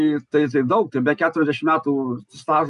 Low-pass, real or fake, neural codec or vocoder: 10.8 kHz; real; none